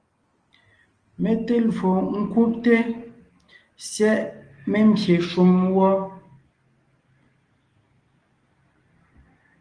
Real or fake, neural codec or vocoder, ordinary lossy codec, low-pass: real; none; Opus, 24 kbps; 9.9 kHz